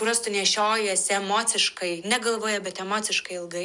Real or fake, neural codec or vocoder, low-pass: real; none; 10.8 kHz